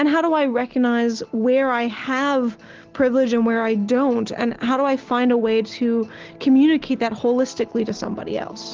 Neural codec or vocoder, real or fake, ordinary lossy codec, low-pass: none; real; Opus, 16 kbps; 7.2 kHz